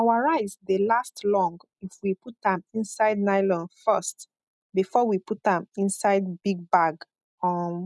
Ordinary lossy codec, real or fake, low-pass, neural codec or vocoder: none; real; none; none